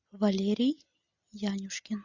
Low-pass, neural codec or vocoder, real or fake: 7.2 kHz; none; real